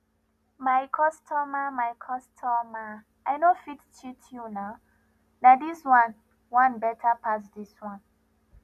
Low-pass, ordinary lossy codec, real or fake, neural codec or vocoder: 14.4 kHz; none; real; none